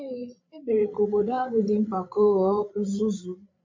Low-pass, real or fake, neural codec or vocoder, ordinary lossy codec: 7.2 kHz; fake; codec, 16 kHz, 8 kbps, FreqCodec, larger model; MP3, 48 kbps